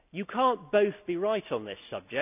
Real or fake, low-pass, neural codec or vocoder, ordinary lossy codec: real; 3.6 kHz; none; none